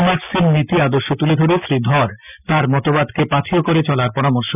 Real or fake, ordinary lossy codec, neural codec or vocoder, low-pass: real; none; none; 3.6 kHz